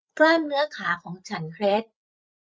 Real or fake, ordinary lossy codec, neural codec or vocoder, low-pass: fake; none; codec, 16 kHz, 8 kbps, FreqCodec, larger model; none